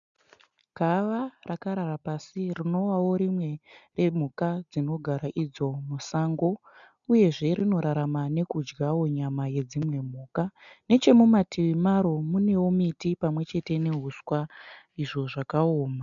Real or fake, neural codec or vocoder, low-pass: real; none; 7.2 kHz